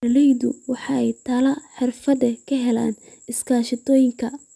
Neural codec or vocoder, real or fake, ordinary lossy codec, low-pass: none; real; none; none